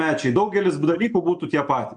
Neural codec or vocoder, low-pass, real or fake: none; 9.9 kHz; real